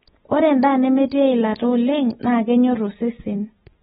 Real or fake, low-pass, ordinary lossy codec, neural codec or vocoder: real; 19.8 kHz; AAC, 16 kbps; none